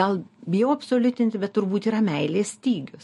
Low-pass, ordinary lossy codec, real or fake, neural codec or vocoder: 14.4 kHz; MP3, 48 kbps; real; none